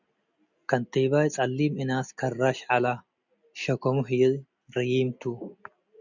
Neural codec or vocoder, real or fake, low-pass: none; real; 7.2 kHz